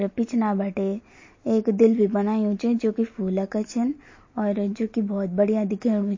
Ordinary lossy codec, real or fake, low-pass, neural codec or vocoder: MP3, 32 kbps; real; 7.2 kHz; none